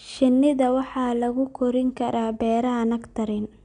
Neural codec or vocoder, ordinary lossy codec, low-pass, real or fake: none; none; 9.9 kHz; real